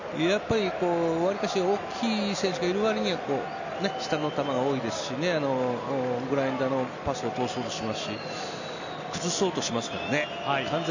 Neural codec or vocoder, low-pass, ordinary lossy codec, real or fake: none; 7.2 kHz; none; real